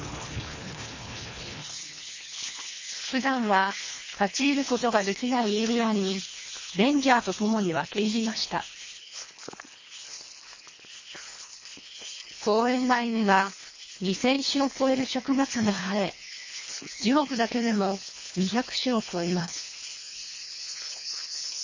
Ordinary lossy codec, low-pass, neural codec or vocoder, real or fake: MP3, 32 kbps; 7.2 kHz; codec, 24 kHz, 1.5 kbps, HILCodec; fake